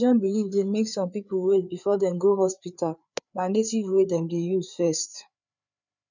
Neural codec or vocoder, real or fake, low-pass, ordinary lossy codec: codec, 16 kHz, 4 kbps, FreqCodec, larger model; fake; 7.2 kHz; none